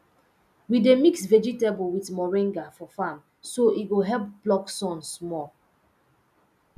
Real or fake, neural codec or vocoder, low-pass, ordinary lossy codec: real; none; 14.4 kHz; none